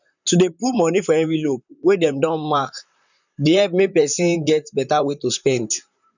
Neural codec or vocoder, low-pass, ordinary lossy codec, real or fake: vocoder, 44.1 kHz, 128 mel bands, Pupu-Vocoder; 7.2 kHz; none; fake